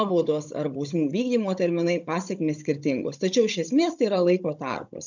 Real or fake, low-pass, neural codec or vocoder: fake; 7.2 kHz; codec, 16 kHz, 16 kbps, FunCodec, trained on Chinese and English, 50 frames a second